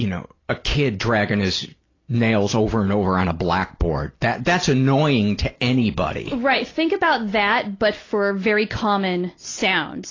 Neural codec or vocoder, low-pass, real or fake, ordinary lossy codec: none; 7.2 kHz; real; AAC, 32 kbps